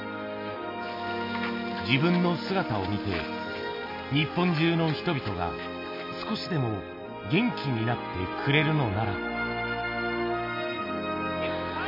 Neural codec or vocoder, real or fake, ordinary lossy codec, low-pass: none; real; MP3, 32 kbps; 5.4 kHz